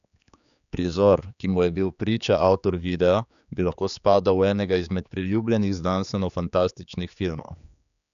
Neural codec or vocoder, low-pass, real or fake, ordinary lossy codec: codec, 16 kHz, 4 kbps, X-Codec, HuBERT features, trained on general audio; 7.2 kHz; fake; none